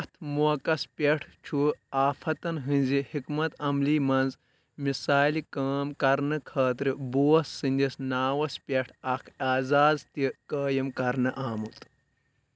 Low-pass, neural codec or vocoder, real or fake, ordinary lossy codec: none; none; real; none